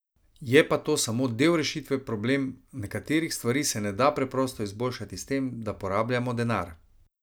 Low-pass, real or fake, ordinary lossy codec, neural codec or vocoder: none; real; none; none